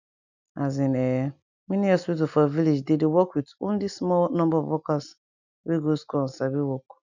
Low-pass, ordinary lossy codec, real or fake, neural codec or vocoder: 7.2 kHz; none; real; none